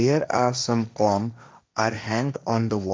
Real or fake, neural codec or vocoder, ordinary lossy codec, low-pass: fake; codec, 16 kHz, 1.1 kbps, Voila-Tokenizer; none; none